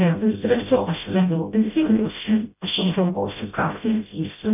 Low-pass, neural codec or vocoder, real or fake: 3.6 kHz; codec, 16 kHz, 0.5 kbps, FreqCodec, smaller model; fake